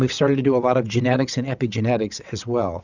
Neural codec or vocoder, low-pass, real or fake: vocoder, 22.05 kHz, 80 mel bands, WaveNeXt; 7.2 kHz; fake